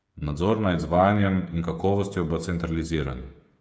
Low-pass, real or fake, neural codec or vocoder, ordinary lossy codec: none; fake; codec, 16 kHz, 8 kbps, FreqCodec, smaller model; none